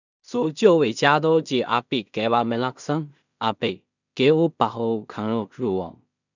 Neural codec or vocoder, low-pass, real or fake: codec, 16 kHz in and 24 kHz out, 0.4 kbps, LongCat-Audio-Codec, two codebook decoder; 7.2 kHz; fake